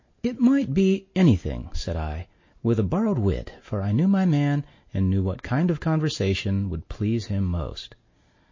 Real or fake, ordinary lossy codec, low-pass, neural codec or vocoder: real; MP3, 32 kbps; 7.2 kHz; none